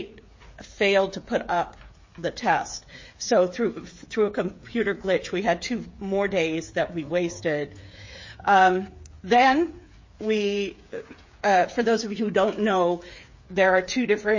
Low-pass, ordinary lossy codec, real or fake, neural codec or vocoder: 7.2 kHz; MP3, 32 kbps; fake; codec, 16 kHz, 8 kbps, FreqCodec, smaller model